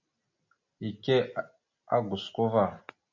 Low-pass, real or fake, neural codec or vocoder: 7.2 kHz; real; none